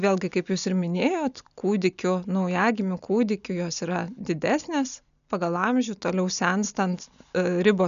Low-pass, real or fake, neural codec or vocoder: 7.2 kHz; real; none